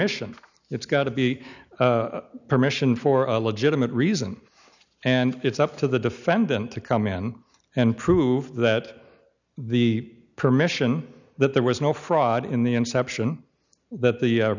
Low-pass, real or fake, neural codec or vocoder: 7.2 kHz; real; none